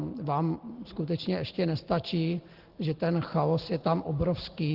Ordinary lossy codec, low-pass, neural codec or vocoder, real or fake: Opus, 16 kbps; 5.4 kHz; none; real